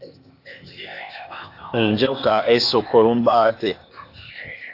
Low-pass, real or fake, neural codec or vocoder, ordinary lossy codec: 5.4 kHz; fake; codec, 16 kHz, 0.8 kbps, ZipCodec; AAC, 32 kbps